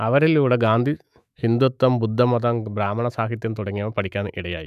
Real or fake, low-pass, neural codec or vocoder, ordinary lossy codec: fake; 14.4 kHz; autoencoder, 48 kHz, 128 numbers a frame, DAC-VAE, trained on Japanese speech; none